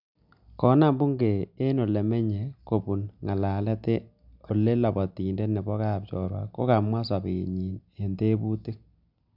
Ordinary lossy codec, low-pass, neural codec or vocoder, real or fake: none; 5.4 kHz; none; real